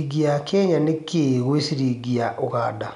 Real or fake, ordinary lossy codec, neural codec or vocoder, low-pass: real; none; none; 10.8 kHz